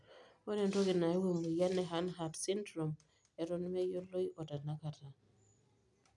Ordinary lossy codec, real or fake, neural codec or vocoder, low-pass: none; real; none; 10.8 kHz